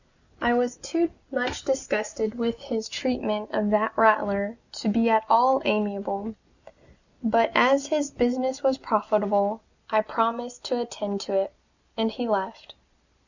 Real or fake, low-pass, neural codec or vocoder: real; 7.2 kHz; none